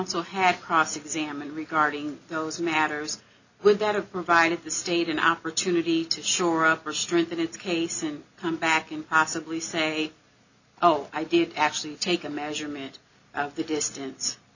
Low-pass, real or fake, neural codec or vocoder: 7.2 kHz; real; none